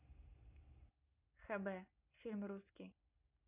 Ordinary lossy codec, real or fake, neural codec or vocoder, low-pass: none; real; none; 3.6 kHz